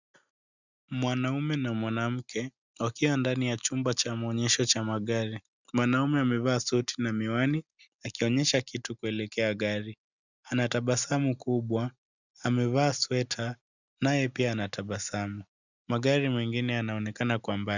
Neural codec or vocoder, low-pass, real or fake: none; 7.2 kHz; real